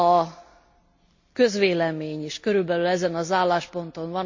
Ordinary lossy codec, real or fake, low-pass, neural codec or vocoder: MP3, 48 kbps; real; 7.2 kHz; none